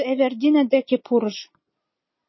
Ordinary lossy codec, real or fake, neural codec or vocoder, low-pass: MP3, 24 kbps; real; none; 7.2 kHz